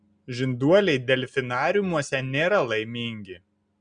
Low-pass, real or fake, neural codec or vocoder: 9.9 kHz; real; none